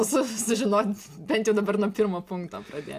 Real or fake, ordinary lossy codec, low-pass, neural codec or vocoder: real; AAC, 64 kbps; 14.4 kHz; none